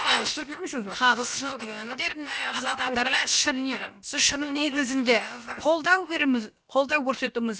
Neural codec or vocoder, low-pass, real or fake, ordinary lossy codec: codec, 16 kHz, about 1 kbps, DyCAST, with the encoder's durations; none; fake; none